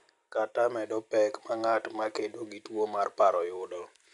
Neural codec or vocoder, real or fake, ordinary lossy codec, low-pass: none; real; AAC, 64 kbps; 10.8 kHz